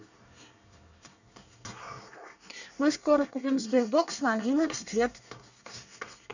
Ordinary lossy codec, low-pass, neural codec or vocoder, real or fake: none; 7.2 kHz; codec, 24 kHz, 1 kbps, SNAC; fake